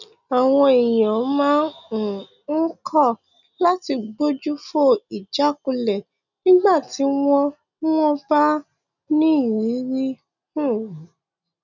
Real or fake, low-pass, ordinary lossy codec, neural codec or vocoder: real; 7.2 kHz; none; none